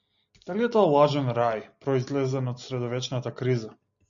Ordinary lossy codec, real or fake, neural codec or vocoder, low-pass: MP3, 96 kbps; real; none; 7.2 kHz